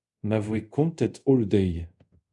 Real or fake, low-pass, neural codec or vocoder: fake; 10.8 kHz; codec, 24 kHz, 0.5 kbps, DualCodec